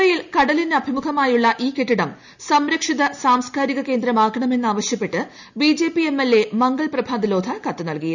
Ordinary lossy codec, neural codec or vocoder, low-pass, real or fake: none; none; 7.2 kHz; real